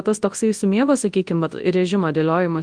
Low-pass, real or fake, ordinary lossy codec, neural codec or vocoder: 9.9 kHz; fake; Opus, 24 kbps; codec, 24 kHz, 0.9 kbps, WavTokenizer, large speech release